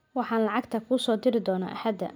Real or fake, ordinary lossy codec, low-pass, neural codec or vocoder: real; none; none; none